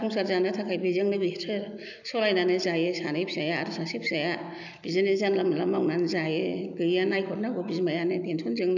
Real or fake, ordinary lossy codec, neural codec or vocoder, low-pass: real; none; none; 7.2 kHz